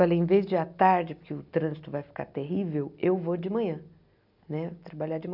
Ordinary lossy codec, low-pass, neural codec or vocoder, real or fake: none; 5.4 kHz; none; real